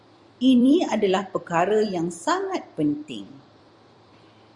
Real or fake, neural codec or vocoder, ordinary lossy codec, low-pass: fake; vocoder, 44.1 kHz, 128 mel bands every 256 samples, BigVGAN v2; Opus, 64 kbps; 10.8 kHz